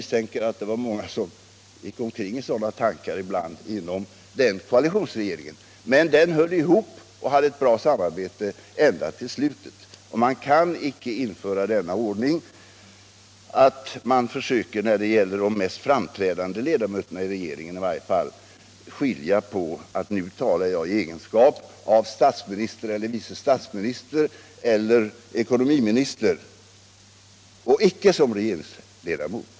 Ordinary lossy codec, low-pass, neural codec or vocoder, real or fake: none; none; none; real